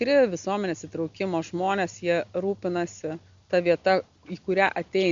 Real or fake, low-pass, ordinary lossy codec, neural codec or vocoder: real; 7.2 kHz; Opus, 64 kbps; none